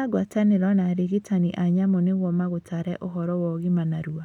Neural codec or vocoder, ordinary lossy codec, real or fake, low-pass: none; none; real; 19.8 kHz